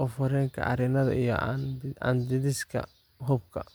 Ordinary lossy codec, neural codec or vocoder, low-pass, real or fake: none; none; none; real